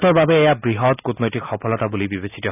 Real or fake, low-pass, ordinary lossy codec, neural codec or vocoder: real; 3.6 kHz; none; none